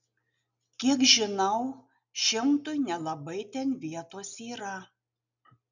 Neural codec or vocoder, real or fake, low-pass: none; real; 7.2 kHz